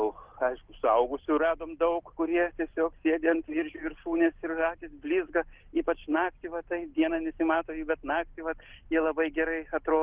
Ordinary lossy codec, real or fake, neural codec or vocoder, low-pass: Opus, 16 kbps; real; none; 3.6 kHz